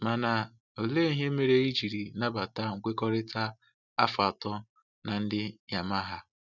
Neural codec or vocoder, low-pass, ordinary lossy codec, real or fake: none; 7.2 kHz; none; real